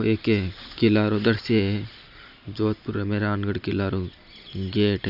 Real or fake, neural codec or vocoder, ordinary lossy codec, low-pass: real; none; none; 5.4 kHz